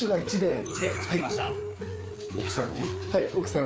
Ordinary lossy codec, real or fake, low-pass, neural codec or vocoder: none; fake; none; codec, 16 kHz, 4 kbps, FreqCodec, larger model